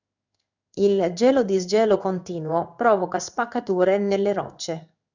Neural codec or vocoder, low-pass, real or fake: codec, 16 kHz in and 24 kHz out, 1 kbps, XY-Tokenizer; 7.2 kHz; fake